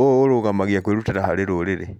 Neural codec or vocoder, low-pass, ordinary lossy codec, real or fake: none; 19.8 kHz; none; real